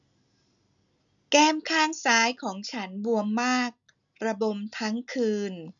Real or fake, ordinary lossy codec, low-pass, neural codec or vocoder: real; none; 7.2 kHz; none